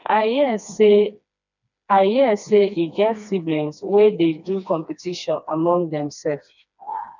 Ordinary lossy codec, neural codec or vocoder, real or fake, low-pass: none; codec, 16 kHz, 2 kbps, FreqCodec, smaller model; fake; 7.2 kHz